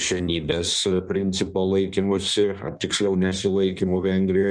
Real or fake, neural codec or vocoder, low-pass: fake; codec, 16 kHz in and 24 kHz out, 1.1 kbps, FireRedTTS-2 codec; 9.9 kHz